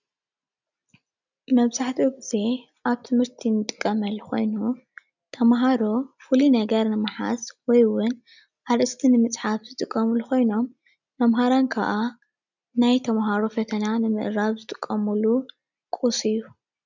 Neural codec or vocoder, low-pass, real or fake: none; 7.2 kHz; real